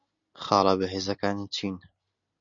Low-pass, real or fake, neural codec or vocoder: 7.2 kHz; real; none